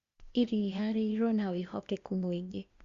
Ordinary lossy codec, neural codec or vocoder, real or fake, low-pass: Opus, 64 kbps; codec, 16 kHz, 0.8 kbps, ZipCodec; fake; 7.2 kHz